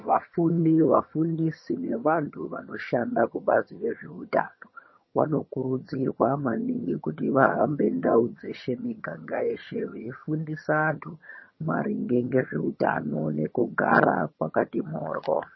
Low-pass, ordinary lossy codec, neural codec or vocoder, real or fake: 7.2 kHz; MP3, 24 kbps; vocoder, 22.05 kHz, 80 mel bands, HiFi-GAN; fake